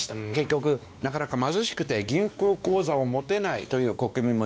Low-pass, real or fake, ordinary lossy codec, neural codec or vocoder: none; fake; none; codec, 16 kHz, 2 kbps, X-Codec, WavLM features, trained on Multilingual LibriSpeech